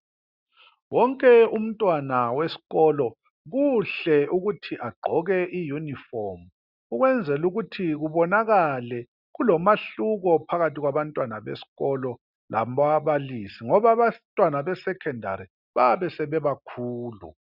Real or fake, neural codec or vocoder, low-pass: real; none; 5.4 kHz